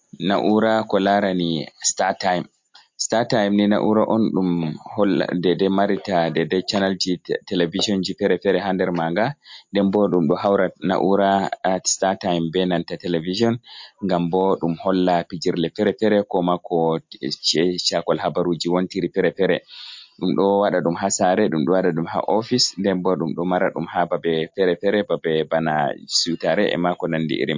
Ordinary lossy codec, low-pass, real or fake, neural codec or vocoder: MP3, 48 kbps; 7.2 kHz; real; none